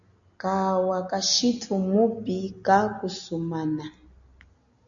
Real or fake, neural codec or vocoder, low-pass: real; none; 7.2 kHz